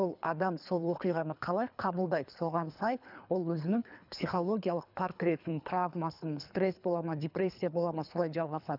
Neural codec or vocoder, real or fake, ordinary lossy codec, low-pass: codec, 24 kHz, 3 kbps, HILCodec; fake; none; 5.4 kHz